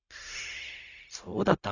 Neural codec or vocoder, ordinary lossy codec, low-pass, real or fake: codec, 16 kHz, 0.4 kbps, LongCat-Audio-Codec; none; 7.2 kHz; fake